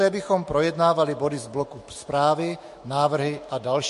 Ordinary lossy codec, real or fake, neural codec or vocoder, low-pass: MP3, 48 kbps; fake; autoencoder, 48 kHz, 128 numbers a frame, DAC-VAE, trained on Japanese speech; 14.4 kHz